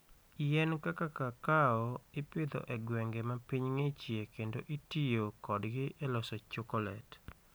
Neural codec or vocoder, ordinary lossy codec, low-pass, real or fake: none; none; none; real